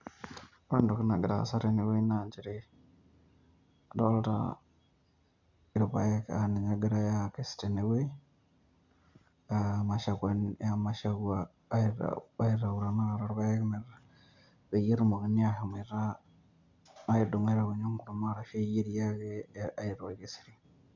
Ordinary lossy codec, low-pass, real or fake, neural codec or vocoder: none; 7.2 kHz; real; none